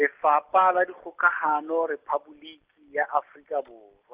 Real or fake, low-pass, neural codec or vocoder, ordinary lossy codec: real; 3.6 kHz; none; Opus, 24 kbps